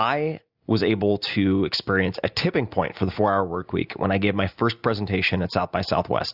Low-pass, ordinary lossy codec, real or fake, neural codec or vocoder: 5.4 kHz; Opus, 64 kbps; real; none